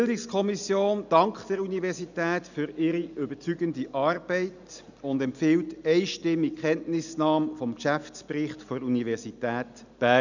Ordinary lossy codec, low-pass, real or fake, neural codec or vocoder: none; 7.2 kHz; real; none